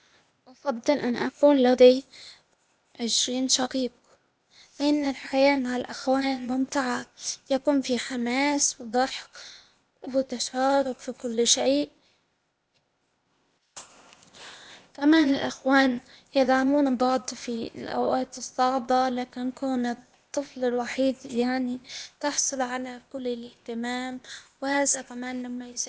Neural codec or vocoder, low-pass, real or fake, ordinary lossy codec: codec, 16 kHz, 0.8 kbps, ZipCodec; none; fake; none